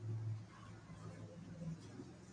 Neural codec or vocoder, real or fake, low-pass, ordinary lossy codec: none; real; 9.9 kHz; MP3, 48 kbps